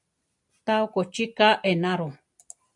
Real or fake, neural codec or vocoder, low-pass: real; none; 10.8 kHz